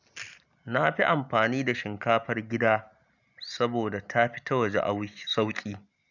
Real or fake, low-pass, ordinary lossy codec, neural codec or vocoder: real; 7.2 kHz; none; none